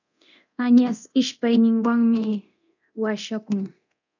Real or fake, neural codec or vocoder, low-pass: fake; codec, 24 kHz, 0.9 kbps, DualCodec; 7.2 kHz